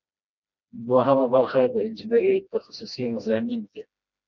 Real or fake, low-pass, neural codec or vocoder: fake; 7.2 kHz; codec, 16 kHz, 1 kbps, FreqCodec, smaller model